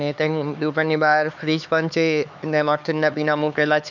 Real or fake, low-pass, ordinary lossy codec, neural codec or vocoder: fake; 7.2 kHz; none; codec, 16 kHz, 4 kbps, X-Codec, HuBERT features, trained on LibriSpeech